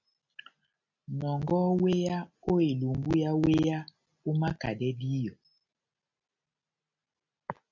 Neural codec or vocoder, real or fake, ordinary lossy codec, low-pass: none; real; MP3, 64 kbps; 7.2 kHz